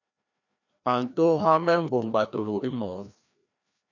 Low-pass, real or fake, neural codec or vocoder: 7.2 kHz; fake; codec, 16 kHz, 1 kbps, FreqCodec, larger model